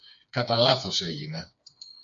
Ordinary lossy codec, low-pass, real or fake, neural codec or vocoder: AAC, 64 kbps; 7.2 kHz; fake; codec, 16 kHz, 4 kbps, FreqCodec, smaller model